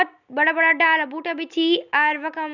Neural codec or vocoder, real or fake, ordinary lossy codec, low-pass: none; real; none; 7.2 kHz